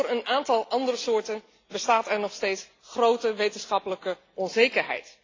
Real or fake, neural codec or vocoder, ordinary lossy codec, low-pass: real; none; AAC, 32 kbps; 7.2 kHz